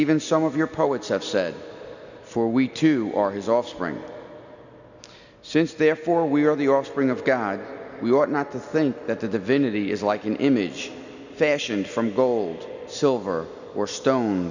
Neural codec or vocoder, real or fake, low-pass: none; real; 7.2 kHz